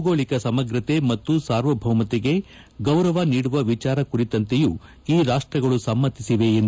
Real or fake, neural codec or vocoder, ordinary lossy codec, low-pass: real; none; none; none